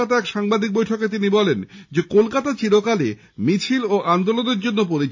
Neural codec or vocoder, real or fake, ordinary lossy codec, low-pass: none; real; AAC, 48 kbps; 7.2 kHz